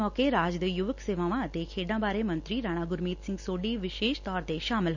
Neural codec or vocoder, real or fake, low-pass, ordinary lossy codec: none; real; 7.2 kHz; none